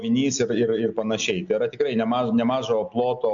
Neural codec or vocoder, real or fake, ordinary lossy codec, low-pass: none; real; MP3, 64 kbps; 7.2 kHz